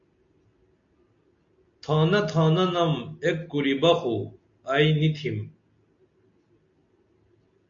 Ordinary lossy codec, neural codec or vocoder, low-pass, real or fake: MP3, 48 kbps; none; 7.2 kHz; real